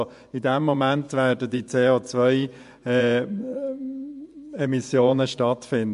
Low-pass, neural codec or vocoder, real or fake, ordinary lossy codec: 10.8 kHz; vocoder, 24 kHz, 100 mel bands, Vocos; fake; MP3, 64 kbps